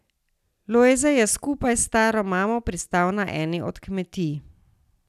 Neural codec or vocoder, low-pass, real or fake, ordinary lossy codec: none; 14.4 kHz; real; none